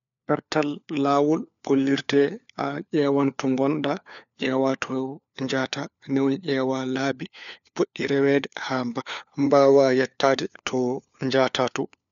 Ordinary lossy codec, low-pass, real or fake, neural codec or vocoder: none; 7.2 kHz; fake; codec, 16 kHz, 4 kbps, FunCodec, trained on LibriTTS, 50 frames a second